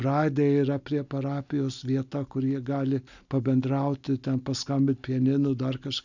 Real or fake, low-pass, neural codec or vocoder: real; 7.2 kHz; none